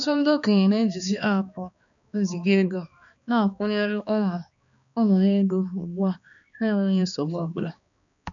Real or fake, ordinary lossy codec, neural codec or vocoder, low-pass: fake; none; codec, 16 kHz, 2 kbps, X-Codec, HuBERT features, trained on balanced general audio; 7.2 kHz